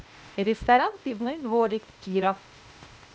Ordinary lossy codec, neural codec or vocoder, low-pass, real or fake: none; codec, 16 kHz, 0.8 kbps, ZipCodec; none; fake